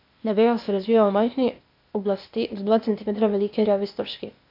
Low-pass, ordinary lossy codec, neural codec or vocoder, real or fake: 5.4 kHz; AAC, 48 kbps; codec, 16 kHz, 0.8 kbps, ZipCodec; fake